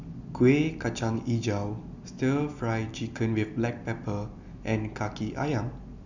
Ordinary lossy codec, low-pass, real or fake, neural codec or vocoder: none; 7.2 kHz; real; none